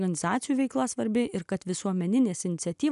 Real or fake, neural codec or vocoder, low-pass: real; none; 10.8 kHz